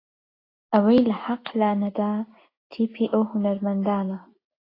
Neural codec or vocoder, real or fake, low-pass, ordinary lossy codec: none; real; 5.4 kHz; AAC, 24 kbps